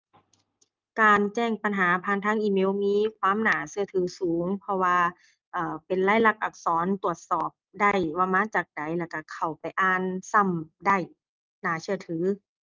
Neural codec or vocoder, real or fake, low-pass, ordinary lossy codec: none; real; 7.2 kHz; Opus, 24 kbps